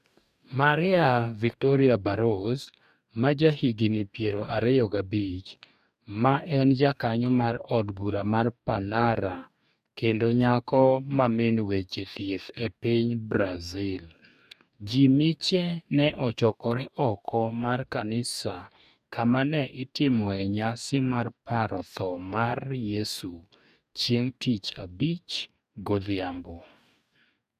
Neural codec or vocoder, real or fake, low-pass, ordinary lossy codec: codec, 44.1 kHz, 2.6 kbps, DAC; fake; 14.4 kHz; none